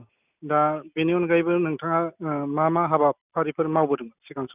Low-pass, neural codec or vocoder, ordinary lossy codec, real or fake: 3.6 kHz; none; none; real